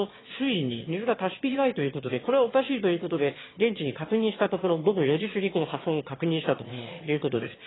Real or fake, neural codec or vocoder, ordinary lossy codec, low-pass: fake; autoencoder, 22.05 kHz, a latent of 192 numbers a frame, VITS, trained on one speaker; AAC, 16 kbps; 7.2 kHz